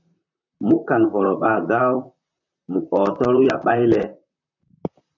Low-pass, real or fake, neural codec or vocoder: 7.2 kHz; fake; vocoder, 44.1 kHz, 128 mel bands, Pupu-Vocoder